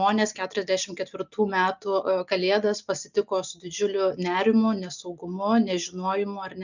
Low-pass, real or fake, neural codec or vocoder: 7.2 kHz; real; none